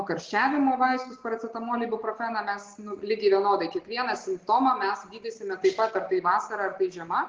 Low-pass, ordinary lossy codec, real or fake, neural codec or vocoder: 7.2 kHz; Opus, 16 kbps; real; none